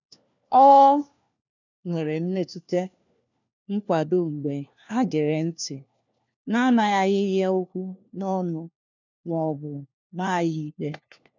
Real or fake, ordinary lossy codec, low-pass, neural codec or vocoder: fake; none; 7.2 kHz; codec, 16 kHz, 1 kbps, FunCodec, trained on LibriTTS, 50 frames a second